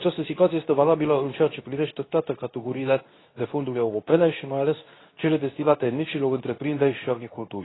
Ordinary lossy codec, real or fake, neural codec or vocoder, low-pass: AAC, 16 kbps; fake; codec, 24 kHz, 0.9 kbps, WavTokenizer, medium speech release version 2; 7.2 kHz